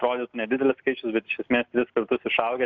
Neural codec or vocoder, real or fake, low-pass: none; real; 7.2 kHz